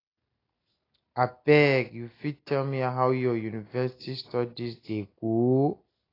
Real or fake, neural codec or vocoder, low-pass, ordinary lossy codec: real; none; 5.4 kHz; AAC, 24 kbps